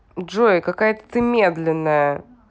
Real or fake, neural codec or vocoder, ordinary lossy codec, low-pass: real; none; none; none